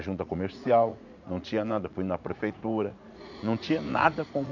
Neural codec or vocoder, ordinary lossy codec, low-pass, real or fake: vocoder, 44.1 kHz, 80 mel bands, Vocos; none; 7.2 kHz; fake